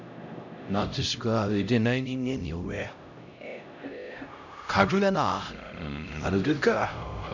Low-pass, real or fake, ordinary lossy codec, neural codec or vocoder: 7.2 kHz; fake; none; codec, 16 kHz, 0.5 kbps, X-Codec, HuBERT features, trained on LibriSpeech